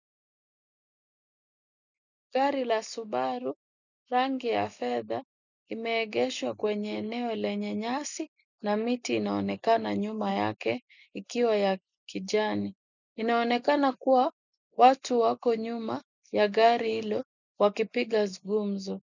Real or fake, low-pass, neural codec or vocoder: real; 7.2 kHz; none